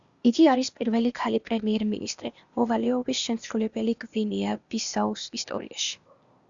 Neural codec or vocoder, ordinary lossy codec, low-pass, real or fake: codec, 16 kHz, 0.8 kbps, ZipCodec; Opus, 64 kbps; 7.2 kHz; fake